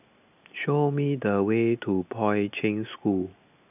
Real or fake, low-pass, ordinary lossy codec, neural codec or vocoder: real; 3.6 kHz; none; none